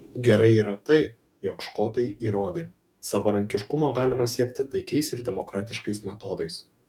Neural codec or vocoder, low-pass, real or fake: codec, 44.1 kHz, 2.6 kbps, DAC; 19.8 kHz; fake